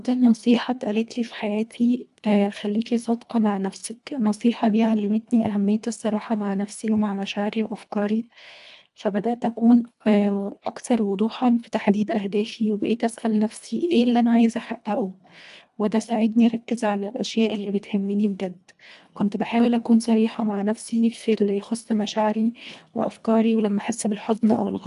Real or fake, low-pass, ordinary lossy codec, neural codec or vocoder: fake; 10.8 kHz; AAC, 96 kbps; codec, 24 kHz, 1.5 kbps, HILCodec